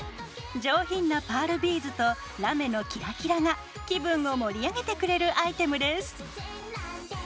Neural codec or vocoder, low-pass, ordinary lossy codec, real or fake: none; none; none; real